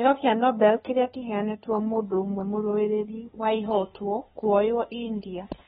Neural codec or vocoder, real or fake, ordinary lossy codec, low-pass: codec, 16 kHz, 2 kbps, FunCodec, trained on LibriTTS, 25 frames a second; fake; AAC, 16 kbps; 7.2 kHz